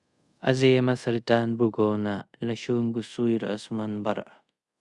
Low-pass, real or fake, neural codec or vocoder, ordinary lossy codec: 10.8 kHz; fake; codec, 24 kHz, 0.5 kbps, DualCodec; Opus, 64 kbps